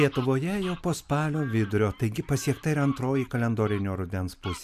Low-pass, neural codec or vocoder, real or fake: 14.4 kHz; none; real